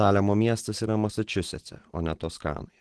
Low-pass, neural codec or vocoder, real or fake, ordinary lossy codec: 10.8 kHz; none; real; Opus, 16 kbps